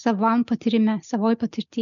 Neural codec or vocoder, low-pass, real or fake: none; 7.2 kHz; real